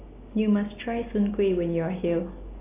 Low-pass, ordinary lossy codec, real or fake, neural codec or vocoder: 3.6 kHz; AAC, 24 kbps; real; none